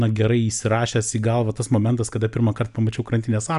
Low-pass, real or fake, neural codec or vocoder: 10.8 kHz; real; none